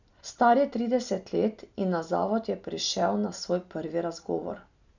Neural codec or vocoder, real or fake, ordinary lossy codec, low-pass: none; real; none; 7.2 kHz